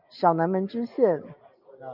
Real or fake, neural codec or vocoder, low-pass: real; none; 5.4 kHz